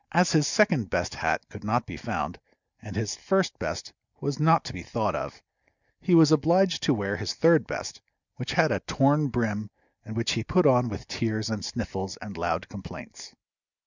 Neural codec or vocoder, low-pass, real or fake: none; 7.2 kHz; real